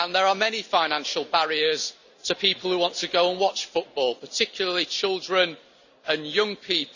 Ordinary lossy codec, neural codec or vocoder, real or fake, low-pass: none; none; real; 7.2 kHz